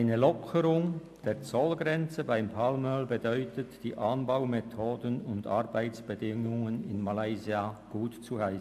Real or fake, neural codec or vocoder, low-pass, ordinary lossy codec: real; none; 14.4 kHz; MP3, 64 kbps